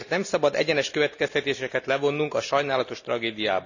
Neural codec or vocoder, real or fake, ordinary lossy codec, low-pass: none; real; none; 7.2 kHz